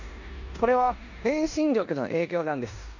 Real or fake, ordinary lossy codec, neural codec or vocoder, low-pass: fake; none; codec, 16 kHz in and 24 kHz out, 0.9 kbps, LongCat-Audio-Codec, four codebook decoder; 7.2 kHz